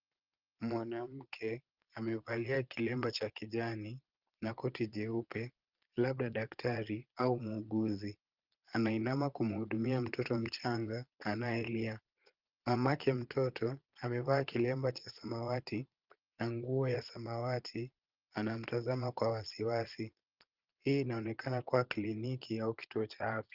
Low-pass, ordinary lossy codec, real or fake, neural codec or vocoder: 5.4 kHz; Opus, 24 kbps; fake; vocoder, 22.05 kHz, 80 mel bands, Vocos